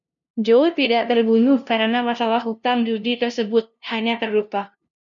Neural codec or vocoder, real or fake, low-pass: codec, 16 kHz, 0.5 kbps, FunCodec, trained on LibriTTS, 25 frames a second; fake; 7.2 kHz